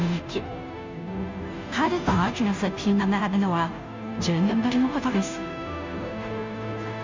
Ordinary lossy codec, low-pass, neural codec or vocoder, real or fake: MP3, 64 kbps; 7.2 kHz; codec, 16 kHz, 0.5 kbps, FunCodec, trained on Chinese and English, 25 frames a second; fake